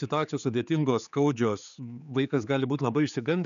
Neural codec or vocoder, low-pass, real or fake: codec, 16 kHz, 4 kbps, X-Codec, HuBERT features, trained on general audio; 7.2 kHz; fake